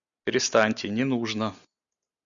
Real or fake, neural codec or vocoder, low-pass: real; none; 7.2 kHz